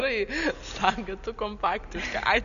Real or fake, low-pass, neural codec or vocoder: real; 7.2 kHz; none